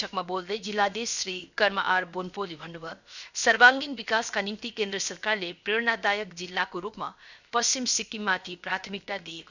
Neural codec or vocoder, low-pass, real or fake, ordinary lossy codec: codec, 16 kHz, 0.7 kbps, FocalCodec; 7.2 kHz; fake; none